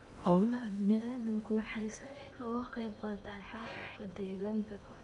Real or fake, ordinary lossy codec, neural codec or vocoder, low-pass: fake; none; codec, 16 kHz in and 24 kHz out, 0.8 kbps, FocalCodec, streaming, 65536 codes; 10.8 kHz